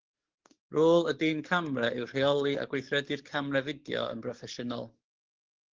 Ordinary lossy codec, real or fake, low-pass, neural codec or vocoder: Opus, 16 kbps; fake; 7.2 kHz; codec, 44.1 kHz, 7.8 kbps, DAC